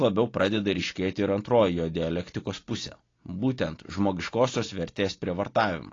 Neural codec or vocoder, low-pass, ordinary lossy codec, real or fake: none; 7.2 kHz; AAC, 32 kbps; real